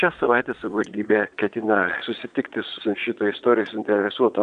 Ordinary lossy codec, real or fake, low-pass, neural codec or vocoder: AAC, 96 kbps; fake; 9.9 kHz; vocoder, 22.05 kHz, 80 mel bands, Vocos